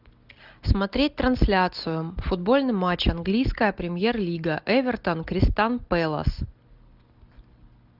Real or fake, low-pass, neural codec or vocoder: real; 5.4 kHz; none